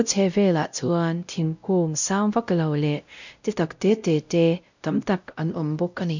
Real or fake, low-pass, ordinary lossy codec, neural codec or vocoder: fake; 7.2 kHz; none; codec, 16 kHz, 0.5 kbps, X-Codec, WavLM features, trained on Multilingual LibriSpeech